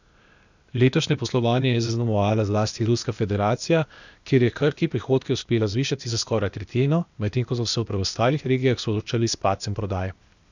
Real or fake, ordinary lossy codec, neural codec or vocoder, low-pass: fake; none; codec, 16 kHz, 0.8 kbps, ZipCodec; 7.2 kHz